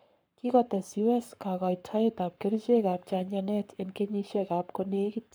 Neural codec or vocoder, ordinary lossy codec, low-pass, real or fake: codec, 44.1 kHz, 7.8 kbps, Pupu-Codec; none; none; fake